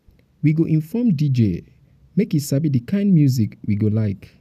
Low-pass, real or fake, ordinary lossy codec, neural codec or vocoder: 14.4 kHz; real; none; none